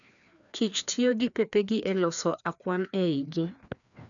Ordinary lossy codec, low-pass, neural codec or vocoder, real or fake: none; 7.2 kHz; codec, 16 kHz, 2 kbps, FreqCodec, larger model; fake